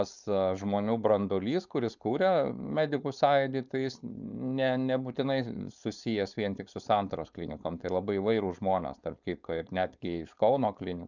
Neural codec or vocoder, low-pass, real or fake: codec, 16 kHz, 4.8 kbps, FACodec; 7.2 kHz; fake